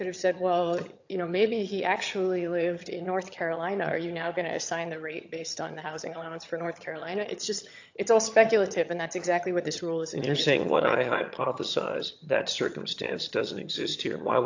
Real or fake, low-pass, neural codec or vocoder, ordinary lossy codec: fake; 7.2 kHz; vocoder, 22.05 kHz, 80 mel bands, HiFi-GAN; AAC, 48 kbps